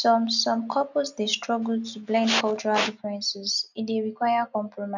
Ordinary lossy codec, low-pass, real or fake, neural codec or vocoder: none; 7.2 kHz; real; none